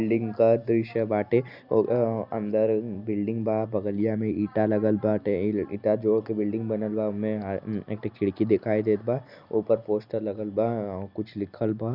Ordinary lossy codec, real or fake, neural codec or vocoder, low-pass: none; real; none; 5.4 kHz